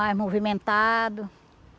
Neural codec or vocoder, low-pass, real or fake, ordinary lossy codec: none; none; real; none